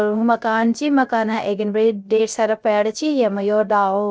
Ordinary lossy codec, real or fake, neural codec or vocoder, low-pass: none; fake; codec, 16 kHz, 0.3 kbps, FocalCodec; none